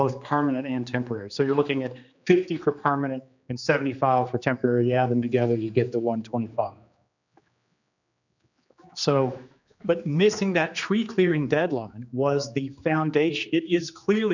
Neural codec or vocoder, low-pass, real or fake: codec, 16 kHz, 2 kbps, X-Codec, HuBERT features, trained on general audio; 7.2 kHz; fake